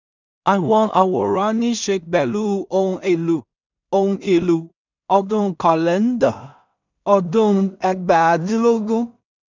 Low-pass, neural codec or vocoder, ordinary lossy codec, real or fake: 7.2 kHz; codec, 16 kHz in and 24 kHz out, 0.4 kbps, LongCat-Audio-Codec, two codebook decoder; none; fake